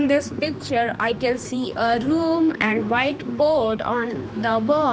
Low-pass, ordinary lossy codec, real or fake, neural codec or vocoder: none; none; fake; codec, 16 kHz, 2 kbps, X-Codec, HuBERT features, trained on general audio